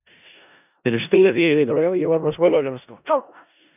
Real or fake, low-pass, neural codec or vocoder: fake; 3.6 kHz; codec, 16 kHz in and 24 kHz out, 0.4 kbps, LongCat-Audio-Codec, four codebook decoder